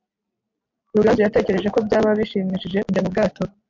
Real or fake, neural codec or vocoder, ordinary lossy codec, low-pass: real; none; MP3, 48 kbps; 7.2 kHz